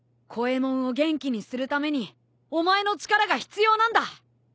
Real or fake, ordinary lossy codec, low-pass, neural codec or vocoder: real; none; none; none